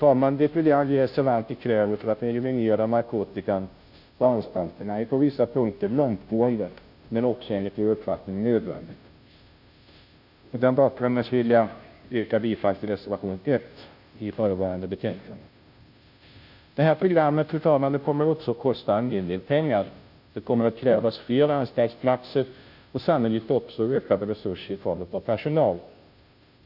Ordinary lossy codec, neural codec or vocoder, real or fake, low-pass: none; codec, 16 kHz, 0.5 kbps, FunCodec, trained on Chinese and English, 25 frames a second; fake; 5.4 kHz